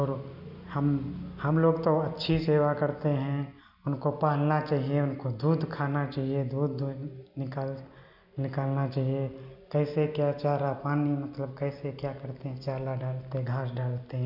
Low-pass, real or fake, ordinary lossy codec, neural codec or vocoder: 5.4 kHz; real; none; none